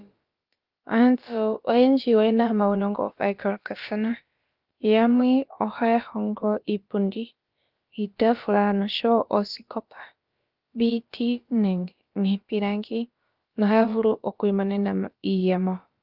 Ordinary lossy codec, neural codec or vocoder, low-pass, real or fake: Opus, 32 kbps; codec, 16 kHz, about 1 kbps, DyCAST, with the encoder's durations; 5.4 kHz; fake